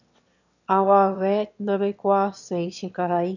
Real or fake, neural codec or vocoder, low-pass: fake; autoencoder, 22.05 kHz, a latent of 192 numbers a frame, VITS, trained on one speaker; 7.2 kHz